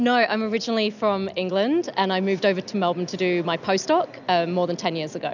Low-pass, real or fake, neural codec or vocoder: 7.2 kHz; real; none